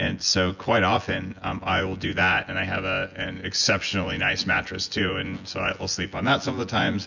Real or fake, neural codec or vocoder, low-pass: fake; vocoder, 24 kHz, 100 mel bands, Vocos; 7.2 kHz